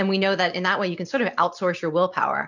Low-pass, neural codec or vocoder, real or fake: 7.2 kHz; none; real